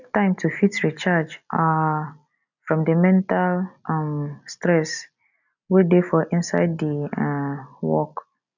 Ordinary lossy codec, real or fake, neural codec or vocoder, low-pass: none; real; none; 7.2 kHz